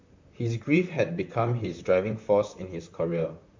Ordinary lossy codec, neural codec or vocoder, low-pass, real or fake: MP3, 64 kbps; vocoder, 44.1 kHz, 128 mel bands, Pupu-Vocoder; 7.2 kHz; fake